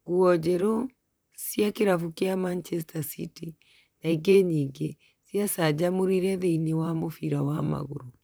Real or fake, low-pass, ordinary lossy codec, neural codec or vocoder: fake; none; none; vocoder, 44.1 kHz, 128 mel bands, Pupu-Vocoder